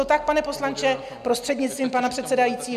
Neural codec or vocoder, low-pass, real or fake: none; 14.4 kHz; real